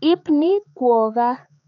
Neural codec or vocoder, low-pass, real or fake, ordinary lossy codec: codec, 16 kHz, 4 kbps, X-Codec, HuBERT features, trained on balanced general audio; 7.2 kHz; fake; none